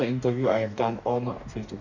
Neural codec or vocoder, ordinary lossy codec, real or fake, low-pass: codec, 44.1 kHz, 2.6 kbps, DAC; none; fake; 7.2 kHz